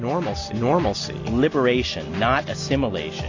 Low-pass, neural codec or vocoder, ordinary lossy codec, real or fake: 7.2 kHz; none; AAC, 48 kbps; real